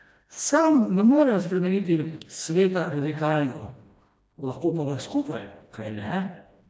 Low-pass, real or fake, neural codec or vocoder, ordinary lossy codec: none; fake; codec, 16 kHz, 1 kbps, FreqCodec, smaller model; none